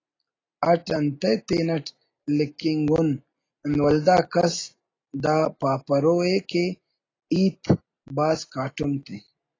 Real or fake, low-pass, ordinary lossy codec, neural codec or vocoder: real; 7.2 kHz; AAC, 32 kbps; none